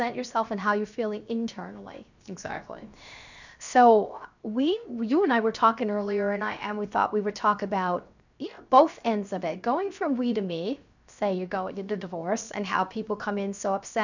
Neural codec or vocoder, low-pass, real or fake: codec, 16 kHz, 0.7 kbps, FocalCodec; 7.2 kHz; fake